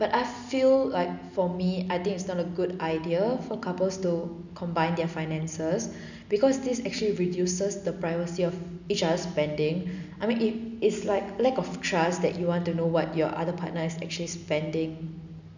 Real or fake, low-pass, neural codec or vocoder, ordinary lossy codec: real; 7.2 kHz; none; none